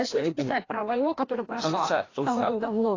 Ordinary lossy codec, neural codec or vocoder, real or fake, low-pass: AAC, 32 kbps; codec, 16 kHz, 1 kbps, FreqCodec, larger model; fake; 7.2 kHz